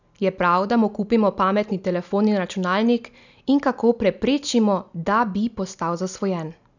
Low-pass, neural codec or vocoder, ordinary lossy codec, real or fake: 7.2 kHz; none; none; real